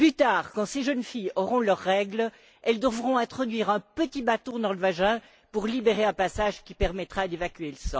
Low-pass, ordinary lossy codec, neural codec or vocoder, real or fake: none; none; none; real